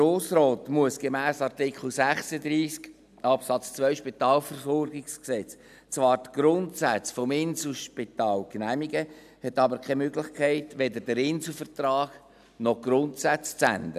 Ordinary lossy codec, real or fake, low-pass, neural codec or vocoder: none; real; 14.4 kHz; none